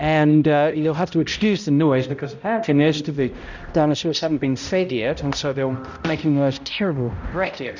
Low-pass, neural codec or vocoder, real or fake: 7.2 kHz; codec, 16 kHz, 0.5 kbps, X-Codec, HuBERT features, trained on balanced general audio; fake